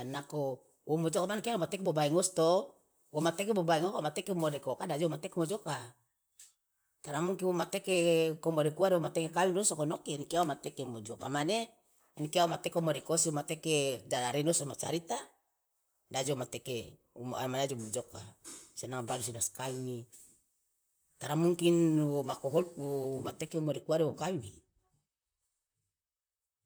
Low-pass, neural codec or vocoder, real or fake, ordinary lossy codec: none; vocoder, 44.1 kHz, 128 mel bands, Pupu-Vocoder; fake; none